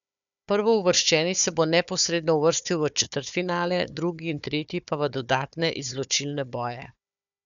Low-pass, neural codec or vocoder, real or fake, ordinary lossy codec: 7.2 kHz; codec, 16 kHz, 4 kbps, FunCodec, trained on Chinese and English, 50 frames a second; fake; none